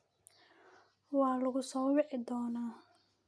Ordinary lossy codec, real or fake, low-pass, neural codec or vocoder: none; real; none; none